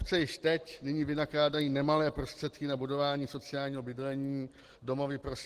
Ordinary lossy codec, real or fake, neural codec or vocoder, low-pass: Opus, 16 kbps; real; none; 14.4 kHz